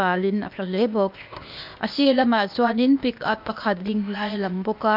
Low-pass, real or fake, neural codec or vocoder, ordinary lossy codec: 5.4 kHz; fake; codec, 16 kHz, 0.8 kbps, ZipCodec; MP3, 48 kbps